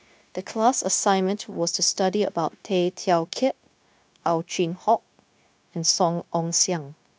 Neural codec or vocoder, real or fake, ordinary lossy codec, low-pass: codec, 16 kHz, 0.9 kbps, LongCat-Audio-Codec; fake; none; none